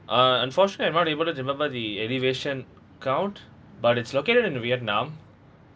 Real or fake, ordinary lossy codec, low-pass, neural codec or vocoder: real; none; none; none